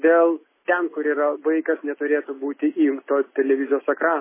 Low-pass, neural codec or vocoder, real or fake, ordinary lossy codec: 3.6 kHz; none; real; MP3, 16 kbps